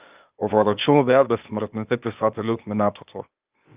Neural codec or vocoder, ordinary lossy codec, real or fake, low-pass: codec, 16 kHz, 0.8 kbps, ZipCodec; Opus, 24 kbps; fake; 3.6 kHz